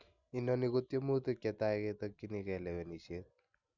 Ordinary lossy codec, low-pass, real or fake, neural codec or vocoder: none; 7.2 kHz; real; none